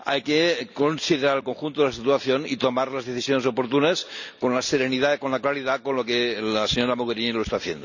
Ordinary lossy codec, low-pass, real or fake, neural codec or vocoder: none; 7.2 kHz; real; none